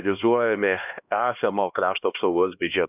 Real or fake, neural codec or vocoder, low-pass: fake; codec, 16 kHz, 1 kbps, X-Codec, HuBERT features, trained on LibriSpeech; 3.6 kHz